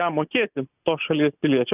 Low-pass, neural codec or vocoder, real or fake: 3.6 kHz; none; real